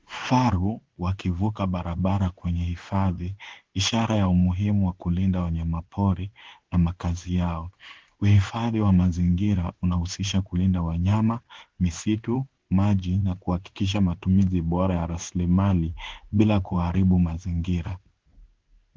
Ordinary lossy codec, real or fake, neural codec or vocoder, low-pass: Opus, 16 kbps; fake; codec, 16 kHz in and 24 kHz out, 1 kbps, XY-Tokenizer; 7.2 kHz